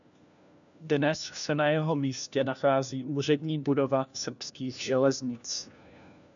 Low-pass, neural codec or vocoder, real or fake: 7.2 kHz; codec, 16 kHz, 1 kbps, FunCodec, trained on LibriTTS, 50 frames a second; fake